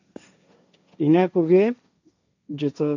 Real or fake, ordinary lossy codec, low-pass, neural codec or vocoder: fake; none; 7.2 kHz; codec, 16 kHz, 1.1 kbps, Voila-Tokenizer